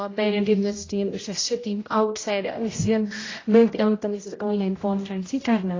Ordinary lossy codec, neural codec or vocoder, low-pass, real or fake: AAC, 32 kbps; codec, 16 kHz, 0.5 kbps, X-Codec, HuBERT features, trained on general audio; 7.2 kHz; fake